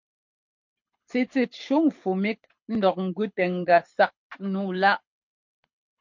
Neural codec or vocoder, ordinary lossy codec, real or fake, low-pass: codec, 24 kHz, 6 kbps, HILCodec; MP3, 48 kbps; fake; 7.2 kHz